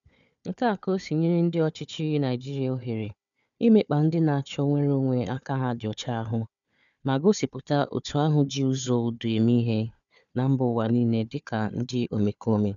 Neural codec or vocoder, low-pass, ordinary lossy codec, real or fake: codec, 16 kHz, 4 kbps, FunCodec, trained on Chinese and English, 50 frames a second; 7.2 kHz; none; fake